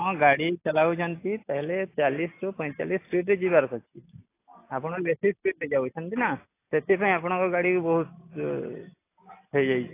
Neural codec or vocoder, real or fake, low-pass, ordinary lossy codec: none; real; 3.6 kHz; AAC, 24 kbps